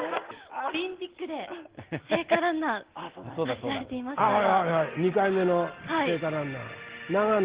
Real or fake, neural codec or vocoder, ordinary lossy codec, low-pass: real; none; Opus, 16 kbps; 3.6 kHz